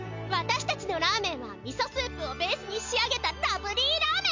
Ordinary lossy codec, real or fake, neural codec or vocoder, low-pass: MP3, 64 kbps; real; none; 7.2 kHz